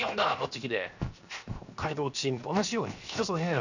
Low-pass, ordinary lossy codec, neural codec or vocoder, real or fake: 7.2 kHz; none; codec, 16 kHz, 0.7 kbps, FocalCodec; fake